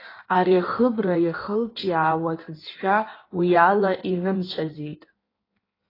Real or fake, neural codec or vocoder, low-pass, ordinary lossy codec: fake; codec, 16 kHz in and 24 kHz out, 1.1 kbps, FireRedTTS-2 codec; 5.4 kHz; AAC, 24 kbps